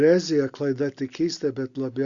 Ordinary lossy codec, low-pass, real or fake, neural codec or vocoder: Opus, 64 kbps; 7.2 kHz; real; none